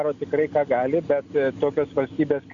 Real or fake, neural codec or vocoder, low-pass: real; none; 7.2 kHz